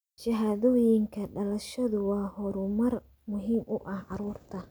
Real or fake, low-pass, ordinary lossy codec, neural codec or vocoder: real; none; none; none